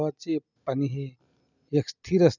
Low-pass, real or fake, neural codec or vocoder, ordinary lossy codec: 7.2 kHz; real; none; none